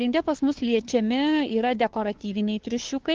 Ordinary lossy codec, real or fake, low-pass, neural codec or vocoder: Opus, 16 kbps; fake; 7.2 kHz; codec, 16 kHz, 2 kbps, FunCodec, trained on Chinese and English, 25 frames a second